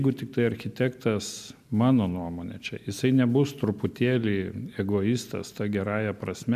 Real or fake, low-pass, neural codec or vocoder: real; 14.4 kHz; none